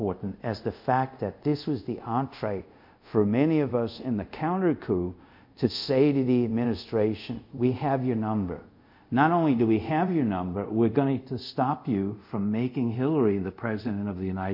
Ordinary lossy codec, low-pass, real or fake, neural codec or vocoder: MP3, 48 kbps; 5.4 kHz; fake; codec, 24 kHz, 0.5 kbps, DualCodec